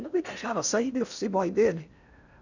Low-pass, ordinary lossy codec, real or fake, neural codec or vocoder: 7.2 kHz; none; fake; codec, 16 kHz in and 24 kHz out, 0.6 kbps, FocalCodec, streaming, 4096 codes